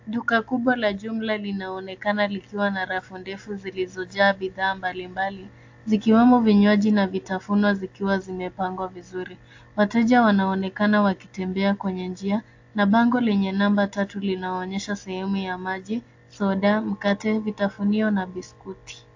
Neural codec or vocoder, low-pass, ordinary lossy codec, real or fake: none; 7.2 kHz; AAC, 48 kbps; real